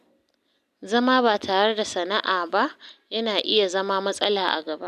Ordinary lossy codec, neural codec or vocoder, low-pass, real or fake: none; none; 14.4 kHz; real